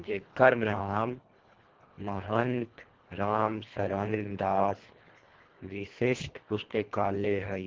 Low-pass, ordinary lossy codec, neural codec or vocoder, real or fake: 7.2 kHz; Opus, 16 kbps; codec, 24 kHz, 1.5 kbps, HILCodec; fake